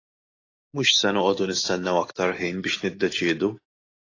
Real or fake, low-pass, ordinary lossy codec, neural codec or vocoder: real; 7.2 kHz; AAC, 32 kbps; none